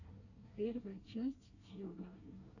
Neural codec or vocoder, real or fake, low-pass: codec, 24 kHz, 1 kbps, SNAC; fake; 7.2 kHz